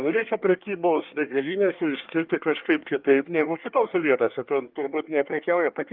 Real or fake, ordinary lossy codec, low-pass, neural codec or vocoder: fake; Opus, 32 kbps; 5.4 kHz; codec, 24 kHz, 1 kbps, SNAC